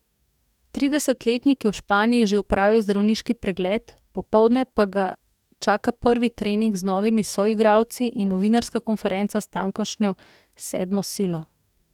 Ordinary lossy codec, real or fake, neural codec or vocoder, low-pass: none; fake; codec, 44.1 kHz, 2.6 kbps, DAC; 19.8 kHz